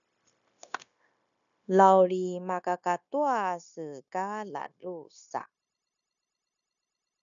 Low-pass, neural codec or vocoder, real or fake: 7.2 kHz; codec, 16 kHz, 0.9 kbps, LongCat-Audio-Codec; fake